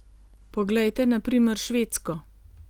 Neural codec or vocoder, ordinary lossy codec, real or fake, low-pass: none; Opus, 24 kbps; real; 19.8 kHz